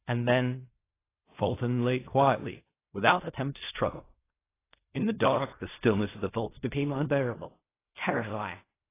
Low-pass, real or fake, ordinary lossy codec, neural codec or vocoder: 3.6 kHz; fake; AAC, 24 kbps; codec, 16 kHz in and 24 kHz out, 0.4 kbps, LongCat-Audio-Codec, fine tuned four codebook decoder